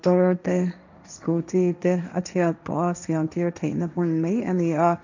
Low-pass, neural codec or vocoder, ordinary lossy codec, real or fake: 7.2 kHz; codec, 16 kHz, 1.1 kbps, Voila-Tokenizer; none; fake